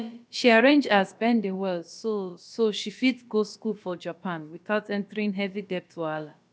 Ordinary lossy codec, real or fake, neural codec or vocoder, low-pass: none; fake; codec, 16 kHz, about 1 kbps, DyCAST, with the encoder's durations; none